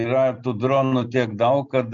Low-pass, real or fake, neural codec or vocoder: 7.2 kHz; real; none